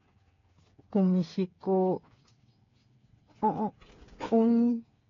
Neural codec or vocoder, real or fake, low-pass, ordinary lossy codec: codec, 16 kHz, 8 kbps, FreqCodec, smaller model; fake; 7.2 kHz; AAC, 32 kbps